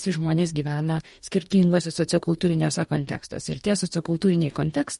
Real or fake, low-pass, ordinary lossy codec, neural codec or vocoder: fake; 19.8 kHz; MP3, 48 kbps; codec, 44.1 kHz, 2.6 kbps, DAC